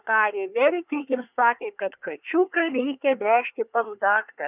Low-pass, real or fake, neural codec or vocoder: 3.6 kHz; fake; codec, 24 kHz, 1 kbps, SNAC